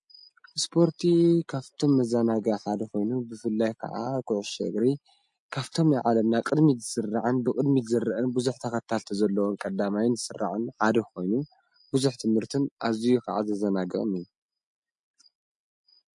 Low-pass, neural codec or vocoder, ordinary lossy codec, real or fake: 10.8 kHz; none; MP3, 48 kbps; real